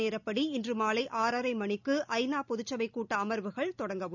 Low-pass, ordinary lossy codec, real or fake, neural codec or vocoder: 7.2 kHz; none; real; none